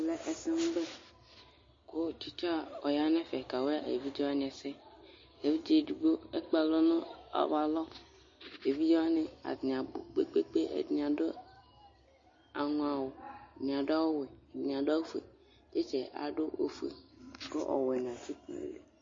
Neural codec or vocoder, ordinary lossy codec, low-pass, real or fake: none; MP3, 32 kbps; 7.2 kHz; real